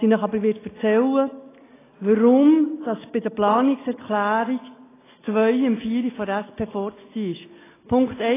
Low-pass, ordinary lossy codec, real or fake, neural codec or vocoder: 3.6 kHz; AAC, 16 kbps; real; none